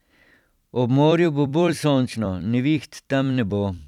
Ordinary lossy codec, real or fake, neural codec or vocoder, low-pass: none; fake; vocoder, 44.1 kHz, 128 mel bands every 256 samples, BigVGAN v2; 19.8 kHz